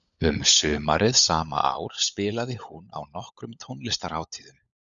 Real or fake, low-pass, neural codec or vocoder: fake; 7.2 kHz; codec, 16 kHz, 16 kbps, FunCodec, trained on LibriTTS, 50 frames a second